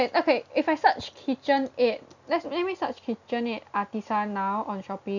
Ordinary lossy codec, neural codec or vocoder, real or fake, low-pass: none; none; real; 7.2 kHz